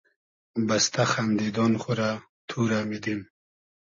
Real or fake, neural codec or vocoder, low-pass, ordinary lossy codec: real; none; 7.2 kHz; MP3, 32 kbps